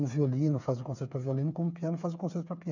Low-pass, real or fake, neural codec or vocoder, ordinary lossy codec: 7.2 kHz; fake; codec, 16 kHz, 8 kbps, FreqCodec, smaller model; none